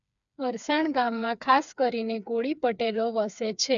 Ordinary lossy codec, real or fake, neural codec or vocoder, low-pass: none; fake; codec, 16 kHz, 4 kbps, FreqCodec, smaller model; 7.2 kHz